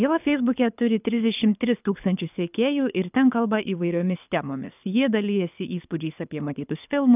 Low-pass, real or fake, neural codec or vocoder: 3.6 kHz; fake; codec, 24 kHz, 6 kbps, HILCodec